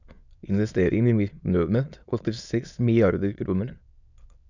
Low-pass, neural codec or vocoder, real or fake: 7.2 kHz; autoencoder, 22.05 kHz, a latent of 192 numbers a frame, VITS, trained on many speakers; fake